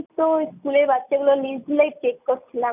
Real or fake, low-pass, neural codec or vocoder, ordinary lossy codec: real; 3.6 kHz; none; none